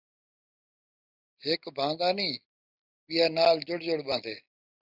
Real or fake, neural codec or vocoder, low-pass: real; none; 5.4 kHz